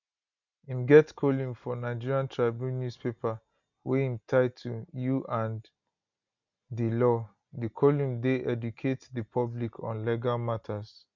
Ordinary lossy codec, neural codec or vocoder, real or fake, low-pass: none; none; real; 7.2 kHz